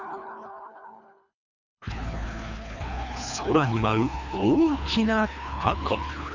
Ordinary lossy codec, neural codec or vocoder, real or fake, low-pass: none; codec, 24 kHz, 3 kbps, HILCodec; fake; 7.2 kHz